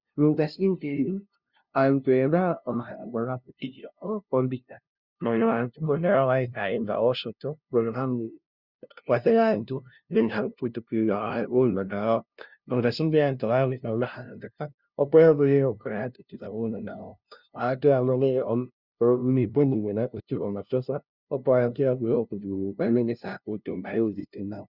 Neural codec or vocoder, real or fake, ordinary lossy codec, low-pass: codec, 16 kHz, 0.5 kbps, FunCodec, trained on LibriTTS, 25 frames a second; fake; Opus, 64 kbps; 5.4 kHz